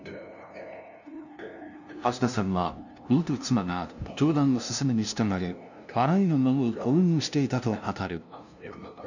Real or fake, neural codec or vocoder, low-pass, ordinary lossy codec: fake; codec, 16 kHz, 0.5 kbps, FunCodec, trained on LibriTTS, 25 frames a second; 7.2 kHz; none